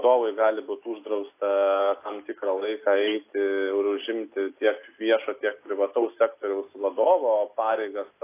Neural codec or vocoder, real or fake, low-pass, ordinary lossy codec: none; real; 3.6 kHz; AAC, 24 kbps